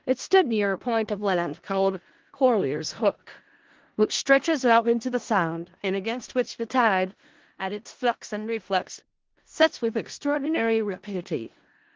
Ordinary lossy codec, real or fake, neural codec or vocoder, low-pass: Opus, 16 kbps; fake; codec, 16 kHz in and 24 kHz out, 0.4 kbps, LongCat-Audio-Codec, four codebook decoder; 7.2 kHz